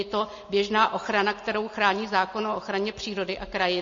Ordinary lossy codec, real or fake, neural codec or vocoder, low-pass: MP3, 32 kbps; real; none; 7.2 kHz